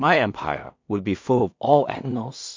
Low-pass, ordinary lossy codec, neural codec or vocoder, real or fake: 7.2 kHz; MP3, 64 kbps; codec, 16 kHz in and 24 kHz out, 0.4 kbps, LongCat-Audio-Codec, two codebook decoder; fake